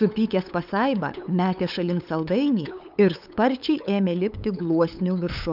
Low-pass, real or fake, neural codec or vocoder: 5.4 kHz; fake; codec, 16 kHz, 8 kbps, FunCodec, trained on LibriTTS, 25 frames a second